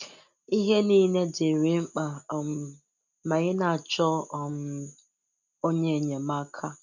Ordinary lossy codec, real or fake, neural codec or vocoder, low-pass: none; real; none; 7.2 kHz